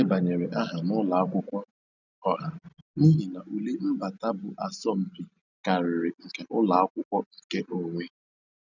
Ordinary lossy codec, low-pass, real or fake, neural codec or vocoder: none; 7.2 kHz; real; none